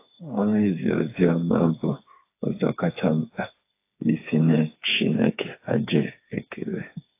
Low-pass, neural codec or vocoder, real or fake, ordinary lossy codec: 3.6 kHz; codec, 16 kHz, 4 kbps, FreqCodec, smaller model; fake; AAC, 24 kbps